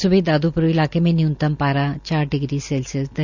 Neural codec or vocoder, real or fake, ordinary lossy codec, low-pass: none; real; none; 7.2 kHz